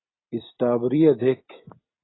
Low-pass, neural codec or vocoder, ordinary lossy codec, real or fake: 7.2 kHz; none; AAC, 16 kbps; real